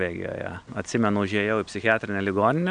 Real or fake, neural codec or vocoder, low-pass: real; none; 9.9 kHz